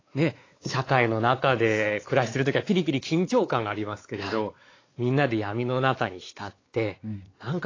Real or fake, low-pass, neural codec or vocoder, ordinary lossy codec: fake; 7.2 kHz; codec, 16 kHz, 4 kbps, X-Codec, WavLM features, trained on Multilingual LibriSpeech; AAC, 32 kbps